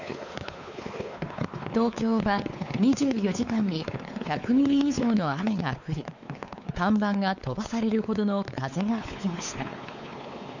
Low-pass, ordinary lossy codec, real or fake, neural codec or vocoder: 7.2 kHz; none; fake; codec, 16 kHz, 4 kbps, X-Codec, WavLM features, trained on Multilingual LibriSpeech